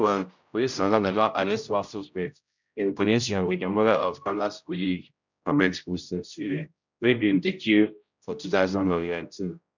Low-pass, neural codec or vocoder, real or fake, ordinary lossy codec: 7.2 kHz; codec, 16 kHz, 0.5 kbps, X-Codec, HuBERT features, trained on general audio; fake; none